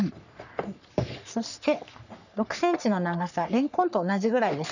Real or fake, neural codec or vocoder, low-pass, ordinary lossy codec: fake; codec, 44.1 kHz, 3.4 kbps, Pupu-Codec; 7.2 kHz; none